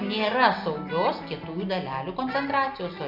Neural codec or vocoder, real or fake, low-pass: none; real; 5.4 kHz